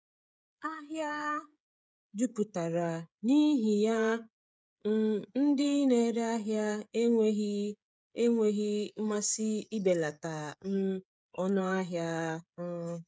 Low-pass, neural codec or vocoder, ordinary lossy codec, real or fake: none; codec, 16 kHz, 16 kbps, FreqCodec, smaller model; none; fake